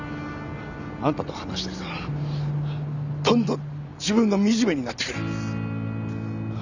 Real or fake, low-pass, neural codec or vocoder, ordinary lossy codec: real; 7.2 kHz; none; none